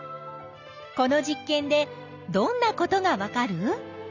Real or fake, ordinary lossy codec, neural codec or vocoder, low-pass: real; none; none; 7.2 kHz